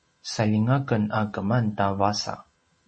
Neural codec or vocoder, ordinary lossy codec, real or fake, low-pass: none; MP3, 32 kbps; real; 10.8 kHz